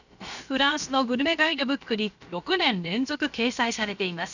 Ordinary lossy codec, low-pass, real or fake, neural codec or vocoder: none; 7.2 kHz; fake; codec, 16 kHz, about 1 kbps, DyCAST, with the encoder's durations